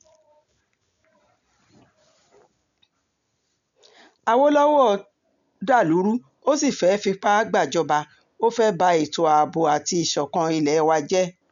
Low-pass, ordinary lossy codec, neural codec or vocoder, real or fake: 7.2 kHz; none; none; real